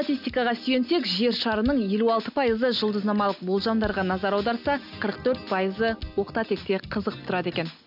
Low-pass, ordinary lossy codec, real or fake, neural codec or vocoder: 5.4 kHz; none; real; none